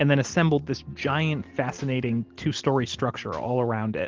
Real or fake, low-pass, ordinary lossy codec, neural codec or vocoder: real; 7.2 kHz; Opus, 16 kbps; none